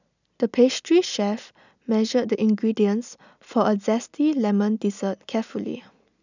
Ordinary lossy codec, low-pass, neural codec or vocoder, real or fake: none; 7.2 kHz; none; real